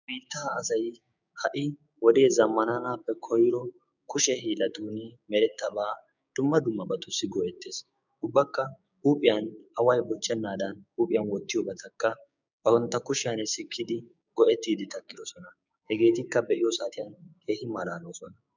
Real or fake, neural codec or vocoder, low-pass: fake; codec, 16 kHz, 6 kbps, DAC; 7.2 kHz